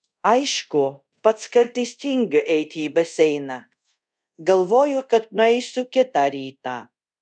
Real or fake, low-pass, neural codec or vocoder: fake; 9.9 kHz; codec, 24 kHz, 0.5 kbps, DualCodec